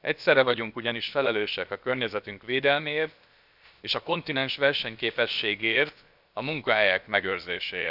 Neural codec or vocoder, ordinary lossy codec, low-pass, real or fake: codec, 16 kHz, about 1 kbps, DyCAST, with the encoder's durations; none; 5.4 kHz; fake